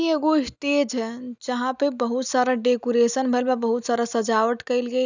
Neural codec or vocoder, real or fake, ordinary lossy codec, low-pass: none; real; none; 7.2 kHz